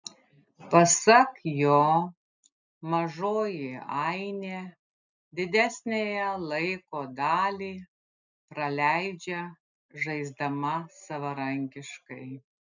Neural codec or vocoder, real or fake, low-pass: none; real; 7.2 kHz